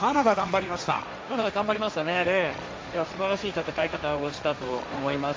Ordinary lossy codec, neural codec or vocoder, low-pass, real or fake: none; codec, 16 kHz, 1.1 kbps, Voila-Tokenizer; none; fake